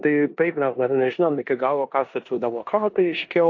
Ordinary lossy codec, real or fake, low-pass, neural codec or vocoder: AAC, 48 kbps; fake; 7.2 kHz; codec, 16 kHz in and 24 kHz out, 0.9 kbps, LongCat-Audio-Codec, four codebook decoder